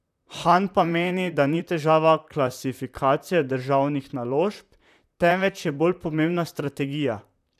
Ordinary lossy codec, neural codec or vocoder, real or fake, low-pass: none; vocoder, 44.1 kHz, 128 mel bands, Pupu-Vocoder; fake; 14.4 kHz